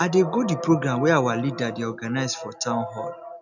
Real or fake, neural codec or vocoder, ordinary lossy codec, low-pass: real; none; none; 7.2 kHz